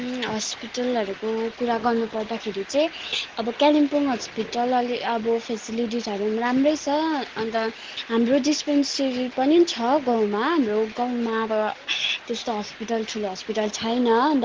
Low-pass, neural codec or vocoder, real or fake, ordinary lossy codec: 7.2 kHz; none; real; Opus, 16 kbps